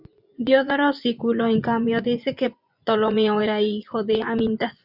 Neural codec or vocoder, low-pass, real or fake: vocoder, 24 kHz, 100 mel bands, Vocos; 5.4 kHz; fake